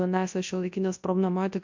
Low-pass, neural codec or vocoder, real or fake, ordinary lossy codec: 7.2 kHz; codec, 24 kHz, 0.9 kbps, WavTokenizer, large speech release; fake; MP3, 48 kbps